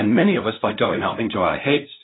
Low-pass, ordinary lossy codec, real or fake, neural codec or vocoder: 7.2 kHz; AAC, 16 kbps; fake; codec, 16 kHz, 0.5 kbps, FunCodec, trained on LibriTTS, 25 frames a second